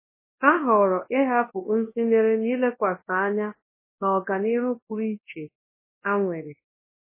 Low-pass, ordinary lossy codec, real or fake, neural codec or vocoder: 3.6 kHz; MP3, 16 kbps; fake; codec, 24 kHz, 0.9 kbps, WavTokenizer, large speech release